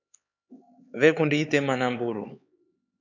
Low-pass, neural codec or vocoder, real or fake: 7.2 kHz; codec, 16 kHz, 4 kbps, X-Codec, HuBERT features, trained on LibriSpeech; fake